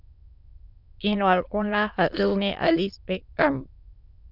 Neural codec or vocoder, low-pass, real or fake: autoencoder, 22.05 kHz, a latent of 192 numbers a frame, VITS, trained on many speakers; 5.4 kHz; fake